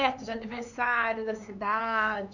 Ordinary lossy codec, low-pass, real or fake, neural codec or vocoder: none; 7.2 kHz; fake; codec, 16 kHz, 4 kbps, X-Codec, HuBERT features, trained on LibriSpeech